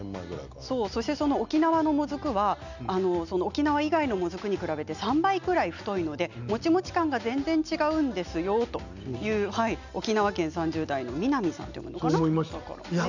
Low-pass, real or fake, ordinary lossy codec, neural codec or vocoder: 7.2 kHz; real; none; none